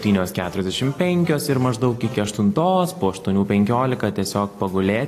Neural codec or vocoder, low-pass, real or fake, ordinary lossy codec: none; 14.4 kHz; real; AAC, 48 kbps